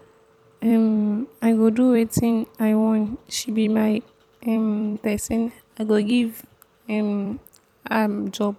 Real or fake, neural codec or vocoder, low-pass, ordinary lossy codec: fake; vocoder, 44.1 kHz, 128 mel bands every 256 samples, BigVGAN v2; 19.8 kHz; none